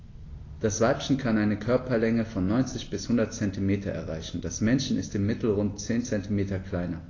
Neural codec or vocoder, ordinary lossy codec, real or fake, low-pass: none; AAC, 32 kbps; real; 7.2 kHz